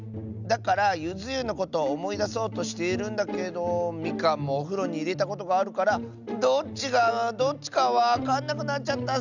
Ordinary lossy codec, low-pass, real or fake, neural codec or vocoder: none; 7.2 kHz; real; none